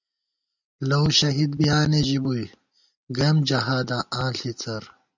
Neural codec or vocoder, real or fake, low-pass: none; real; 7.2 kHz